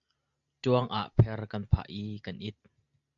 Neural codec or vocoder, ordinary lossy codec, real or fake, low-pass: none; AAC, 64 kbps; real; 7.2 kHz